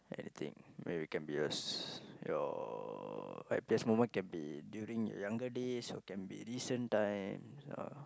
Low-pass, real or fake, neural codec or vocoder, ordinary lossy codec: none; real; none; none